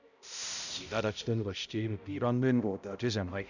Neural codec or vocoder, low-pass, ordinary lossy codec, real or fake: codec, 16 kHz, 0.5 kbps, X-Codec, HuBERT features, trained on balanced general audio; 7.2 kHz; none; fake